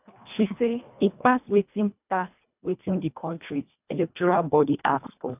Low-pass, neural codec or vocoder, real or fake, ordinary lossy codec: 3.6 kHz; codec, 24 kHz, 1.5 kbps, HILCodec; fake; none